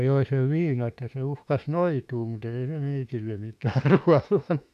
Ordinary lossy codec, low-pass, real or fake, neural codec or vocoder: none; 14.4 kHz; fake; autoencoder, 48 kHz, 32 numbers a frame, DAC-VAE, trained on Japanese speech